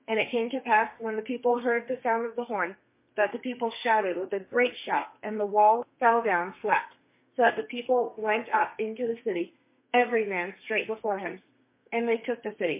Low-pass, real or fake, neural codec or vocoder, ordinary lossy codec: 3.6 kHz; fake; codec, 32 kHz, 1.9 kbps, SNAC; MP3, 24 kbps